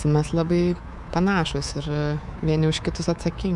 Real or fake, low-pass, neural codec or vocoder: fake; 10.8 kHz; codec, 24 kHz, 3.1 kbps, DualCodec